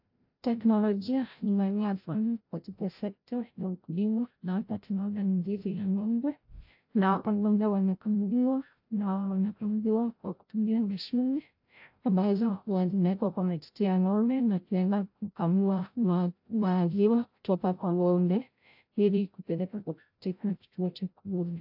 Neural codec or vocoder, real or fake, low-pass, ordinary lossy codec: codec, 16 kHz, 0.5 kbps, FreqCodec, larger model; fake; 5.4 kHz; AAC, 48 kbps